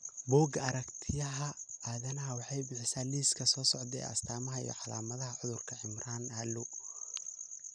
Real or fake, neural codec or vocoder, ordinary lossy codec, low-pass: real; none; none; 9.9 kHz